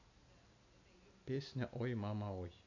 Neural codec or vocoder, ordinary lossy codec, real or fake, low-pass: none; none; real; 7.2 kHz